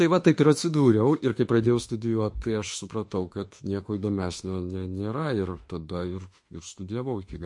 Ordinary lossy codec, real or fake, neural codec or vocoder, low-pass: MP3, 48 kbps; fake; autoencoder, 48 kHz, 32 numbers a frame, DAC-VAE, trained on Japanese speech; 10.8 kHz